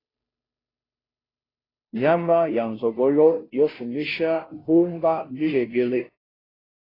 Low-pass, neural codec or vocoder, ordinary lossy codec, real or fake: 5.4 kHz; codec, 16 kHz, 0.5 kbps, FunCodec, trained on Chinese and English, 25 frames a second; AAC, 24 kbps; fake